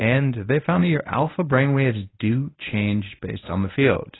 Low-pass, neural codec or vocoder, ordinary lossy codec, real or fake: 7.2 kHz; codec, 24 kHz, 0.9 kbps, WavTokenizer, medium speech release version 1; AAC, 16 kbps; fake